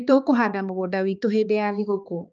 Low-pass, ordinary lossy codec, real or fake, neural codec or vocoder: 7.2 kHz; Opus, 24 kbps; fake; codec, 16 kHz, 2 kbps, X-Codec, HuBERT features, trained on balanced general audio